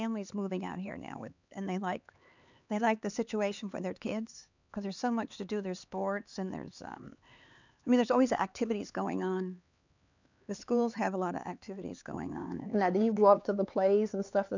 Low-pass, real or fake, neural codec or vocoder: 7.2 kHz; fake; codec, 16 kHz, 4 kbps, X-Codec, HuBERT features, trained on LibriSpeech